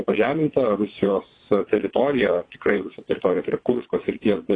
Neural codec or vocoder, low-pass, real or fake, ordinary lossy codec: vocoder, 22.05 kHz, 80 mel bands, WaveNeXt; 9.9 kHz; fake; AAC, 32 kbps